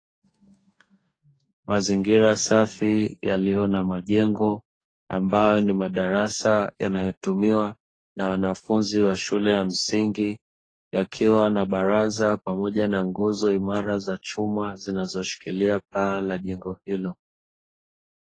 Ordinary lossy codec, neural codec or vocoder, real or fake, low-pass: AAC, 32 kbps; codec, 44.1 kHz, 2.6 kbps, DAC; fake; 9.9 kHz